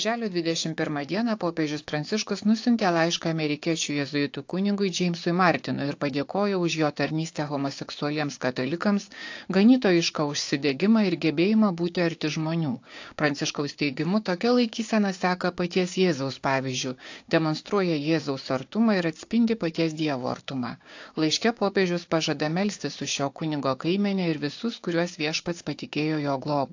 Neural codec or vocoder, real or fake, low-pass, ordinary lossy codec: codec, 16 kHz, 6 kbps, DAC; fake; 7.2 kHz; AAC, 48 kbps